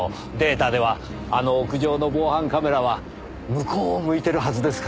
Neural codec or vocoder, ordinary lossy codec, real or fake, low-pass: none; none; real; none